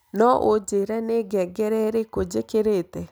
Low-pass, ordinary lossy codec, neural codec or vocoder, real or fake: none; none; none; real